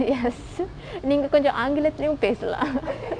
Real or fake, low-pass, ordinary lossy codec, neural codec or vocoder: real; 9.9 kHz; MP3, 64 kbps; none